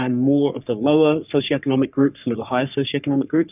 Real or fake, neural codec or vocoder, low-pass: fake; codec, 44.1 kHz, 3.4 kbps, Pupu-Codec; 3.6 kHz